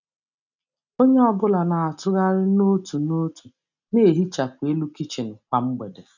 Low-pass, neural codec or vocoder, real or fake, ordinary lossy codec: 7.2 kHz; none; real; none